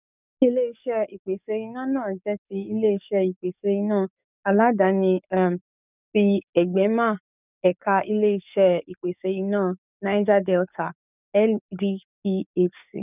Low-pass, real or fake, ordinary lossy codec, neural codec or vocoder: 3.6 kHz; fake; none; codec, 44.1 kHz, 7.8 kbps, DAC